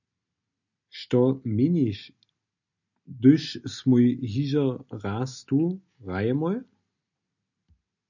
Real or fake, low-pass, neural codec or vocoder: real; 7.2 kHz; none